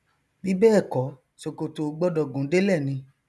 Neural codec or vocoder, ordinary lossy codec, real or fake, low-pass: none; none; real; none